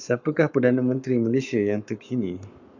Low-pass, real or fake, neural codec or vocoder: 7.2 kHz; fake; codec, 44.1 kHz, 7.8 kbps, Pupu-Codec